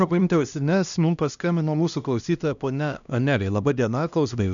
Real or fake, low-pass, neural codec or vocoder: fake; 7.2 kHz; codec, 16 kHz, 1 kbps, X-Codec, HuBERT features, trained on LibriSpeech